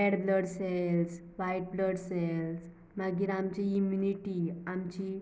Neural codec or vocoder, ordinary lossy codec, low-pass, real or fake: none; none; none; real